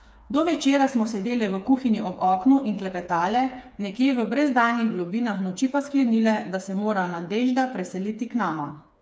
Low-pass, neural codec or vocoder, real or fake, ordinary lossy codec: none; codec, 16 kHz, 4 kbps, FreqCodec, smaller model; fake; none